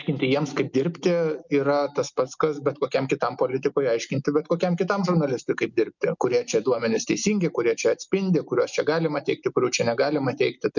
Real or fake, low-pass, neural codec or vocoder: real; 7.2 kHz; none